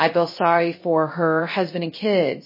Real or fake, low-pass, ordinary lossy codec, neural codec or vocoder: fake; 5.4 kHz; MP3, 24 kbps; codec, 16 kHz, 0.3 kbps, FocalCodec